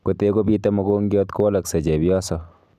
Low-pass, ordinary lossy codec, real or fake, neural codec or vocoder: 14.4 kHz; none; real; none